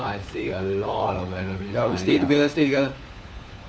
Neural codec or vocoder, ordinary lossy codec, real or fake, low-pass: codec, 16 kHz, 4 kbps, FunCodec, trained on LibriTTS, 50 frames a second; none; fake; none